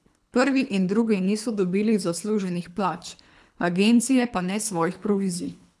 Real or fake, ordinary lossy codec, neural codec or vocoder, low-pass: fake; none; codec, 24 kHz, 3 kbps, HILCodec; none